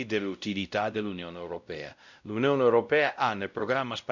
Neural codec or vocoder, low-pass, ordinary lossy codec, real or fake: codec, 16 kHz, 0.5 kbps, X-Codec, WavLM features, trained on Multilingual LibriSpeech; 7.2 kHz; none; fake